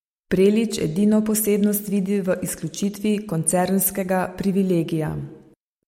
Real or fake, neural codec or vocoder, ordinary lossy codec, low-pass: real; none; MP3, 64 kbps; 19.8 kHz